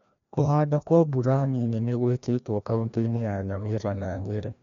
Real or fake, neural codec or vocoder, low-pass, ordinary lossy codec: fake; codec, 16 kHz, 1 kbps, FreqCodec, larger model; 7.2 kHz; MP3, 64 kbps